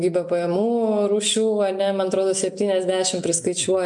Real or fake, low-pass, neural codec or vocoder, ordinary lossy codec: real; 10.8 kHz; none; MP3, 64 kbps